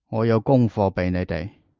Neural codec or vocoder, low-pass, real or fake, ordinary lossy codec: none; 7.2 kHz; real; Opus, 32 kbps